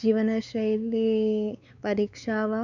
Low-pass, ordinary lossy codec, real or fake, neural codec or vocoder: 7.2 kHz; none; fake; codec, 16 kHz, 4 kbps, X-Codec, WavLM features, trained on Multilingual LibriSpeech